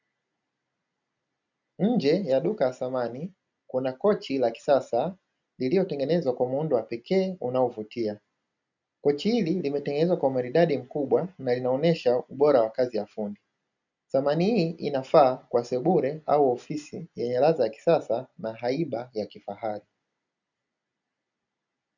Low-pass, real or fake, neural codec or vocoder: 7.2 kHz; real; none